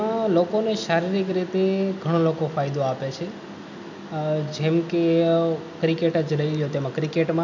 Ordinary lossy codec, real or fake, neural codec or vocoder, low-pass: none; real; none; 7.2 kHz